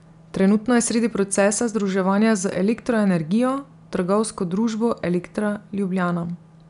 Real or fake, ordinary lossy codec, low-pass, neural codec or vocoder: real; none; 10.8 kHz; none